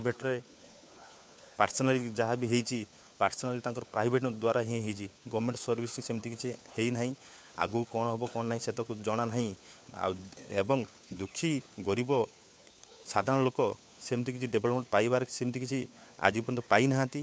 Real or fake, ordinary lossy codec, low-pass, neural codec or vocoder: fake; none; none; codec, 16 kHz, 4 kbps, FunCodec, trained on LibriTTS, 50 frames a second